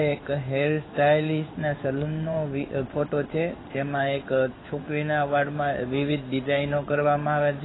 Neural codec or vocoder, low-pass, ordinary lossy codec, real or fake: codec, 16 kHz in and 24 kHz out, 1 kbps, XY-Tokenizer; 7.2 kHz; AAC, 16 kbps; fake